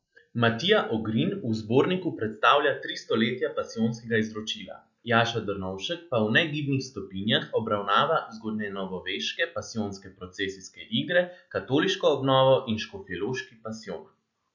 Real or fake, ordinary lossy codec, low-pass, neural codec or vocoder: real; none; 7.2 kHz; none